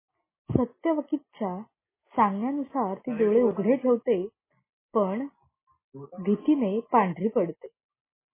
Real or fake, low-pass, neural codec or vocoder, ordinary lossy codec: real; 3.6 kHz; none; MP3, 16 kbps